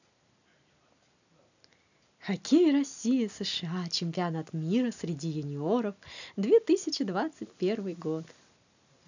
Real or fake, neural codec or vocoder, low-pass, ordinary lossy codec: real; none; 7.2 kHz; none